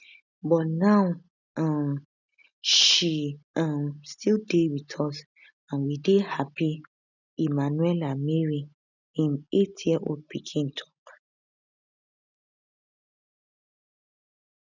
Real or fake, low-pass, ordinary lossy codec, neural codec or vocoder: real; 7.2 kHz; none; none